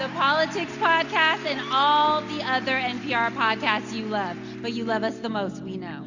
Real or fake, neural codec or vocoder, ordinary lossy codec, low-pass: real; none; Opus, 64 kbps; 7.2 kHz